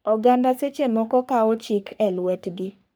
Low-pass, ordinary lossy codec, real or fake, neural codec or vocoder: none; none; fake; codec, 44.1 kHz, 3.4 kbps, Pupu-Codec